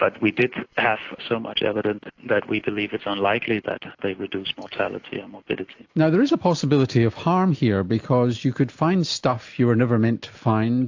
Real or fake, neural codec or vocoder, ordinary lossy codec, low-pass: real; none; AAC, 48 kbps; 7.2 kHz